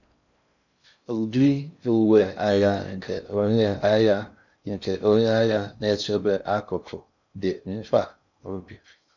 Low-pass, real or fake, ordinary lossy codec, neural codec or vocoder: 7.2 kHz; fake; none; codec, 16 kHz in and 24 kHz out, 0.6 kbps, FocalCodec, streaming, 4096 codes